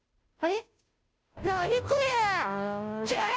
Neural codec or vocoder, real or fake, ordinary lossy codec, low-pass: codec, 16 kHz, 0.5 kbps, FunCodec, trained on Chinese and English, 25 frames a second; fake; none; none